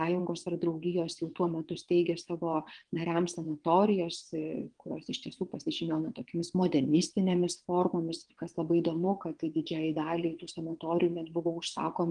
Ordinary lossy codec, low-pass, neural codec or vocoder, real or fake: Opus, 24 kbps; 9.9 kHz; vocoder, 22.05 kHz, 80 mel bands, WaveNeXt; fake